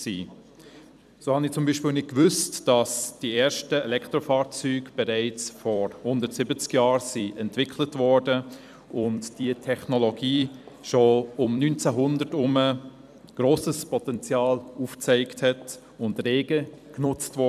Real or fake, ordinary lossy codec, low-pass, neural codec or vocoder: fake; none; 14.4 kHz; vocoder, 44.1 kHz, 128 mel bands every 256 samples, BigVGAN v2